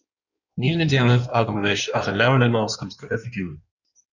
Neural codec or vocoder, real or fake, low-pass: codec, 16 kHz in and 24 kHz out, 1.1 kbps, FireRedTTS-2 codec; fake; 7.2 kHz